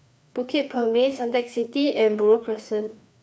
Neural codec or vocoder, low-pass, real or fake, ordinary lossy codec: codec, 16 kHz, 2 kbps, FreqCodec, larger model; none; fake; none